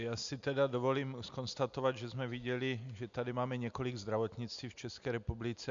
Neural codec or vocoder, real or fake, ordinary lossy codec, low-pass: none; real; MP3, 64 kbps; 7.2 kHz